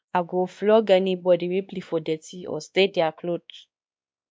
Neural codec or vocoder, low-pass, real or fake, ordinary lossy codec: codec, 16 kHz, 2 kbps, X-Codec, WavLM features, trained on Multilingual LibriSpeech; none; fake; none